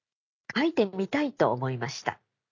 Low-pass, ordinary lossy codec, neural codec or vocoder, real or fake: 7.2 kHz; none; none; real